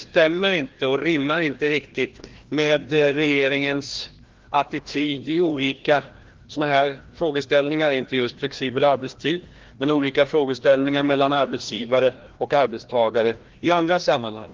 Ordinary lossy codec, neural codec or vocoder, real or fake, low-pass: Opus, 16 kbps; codec, 16 kHz, 1 kbps, FreqCodec, larger model; fake; 7.2 kHz